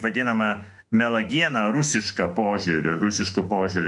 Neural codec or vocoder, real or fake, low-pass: autoencoder, 48 kHz, 32 numbers a frame, DAC-VAE, trained on Japanese speech; fake; 10.8 kHz